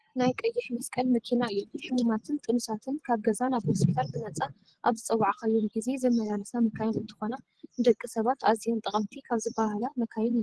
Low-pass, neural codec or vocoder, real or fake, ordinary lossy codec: 10.8 kHz; vocoder, 24 kHz, 100 mel bands, Vocos; fake; Opus, 16 kbps